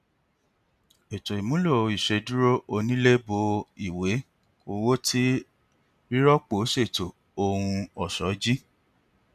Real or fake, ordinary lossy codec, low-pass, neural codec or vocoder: real; none; 14.4 kHz; none